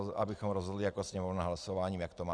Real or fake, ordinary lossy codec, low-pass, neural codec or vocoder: real; Opus, 32 kbps; 9.9 kHz; none